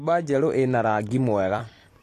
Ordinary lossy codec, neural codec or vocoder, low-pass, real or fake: AAC, 48 kbps; vocoder, 44.1 kHz, 128 mel bands every 256 samples, BigVGAN v2; 14.4 kHz; fake